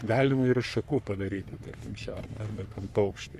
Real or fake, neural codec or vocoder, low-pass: fake; codec, 44.1 kHz, 3.4 kbps, Pupu-Codec; 14.4 kHz